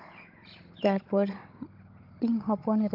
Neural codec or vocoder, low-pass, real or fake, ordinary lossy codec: codec, 16 kHz, 16 kbps, FunCodec, trained on Chinese and English, 50 frames a second; 5.4 kHz; fake; Opus, 32 kbps